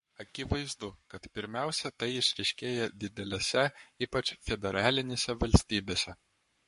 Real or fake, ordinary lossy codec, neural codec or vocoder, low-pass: fake; MP3, 48 kbps; codec, 44.1 kHz, 7.8 kbps, Pupu-Codec; 14.4 kHz